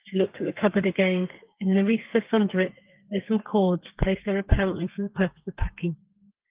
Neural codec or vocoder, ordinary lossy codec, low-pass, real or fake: codec, 32 kHz, 1.9 kbps, SNAC; Opus, 32 kbps; 3.6 kHz; fake